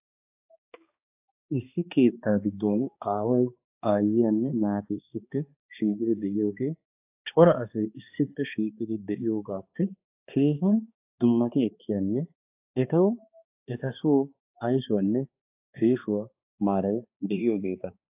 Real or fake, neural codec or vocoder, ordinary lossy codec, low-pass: fake; codec, 16 kHz, 2 kbps, X-Codec, HuBERT features, trained on balanced general audio; AAC, 32 kbps; 3.6 kHz